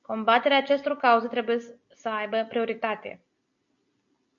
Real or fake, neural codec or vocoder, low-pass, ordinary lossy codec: real; none; 7.2 kHz; MP3, 64 kbps